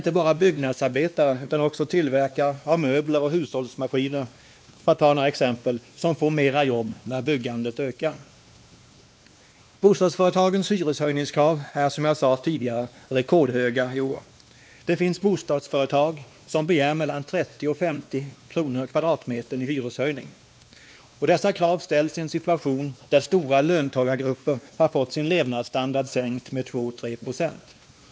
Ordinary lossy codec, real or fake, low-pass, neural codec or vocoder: none; fake; none; codec, 16 kHz, 2 kbps, X-Codec, WavLM features, trained on Multilingual LibriSpeech